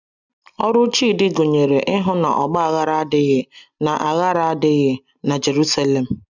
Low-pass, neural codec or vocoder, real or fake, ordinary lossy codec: 7.2 kHz; none; real; none